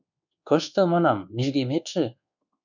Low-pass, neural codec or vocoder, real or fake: 7.2 kHz; codec, 24 kHz, 1.2 kbps, DualCodec; fake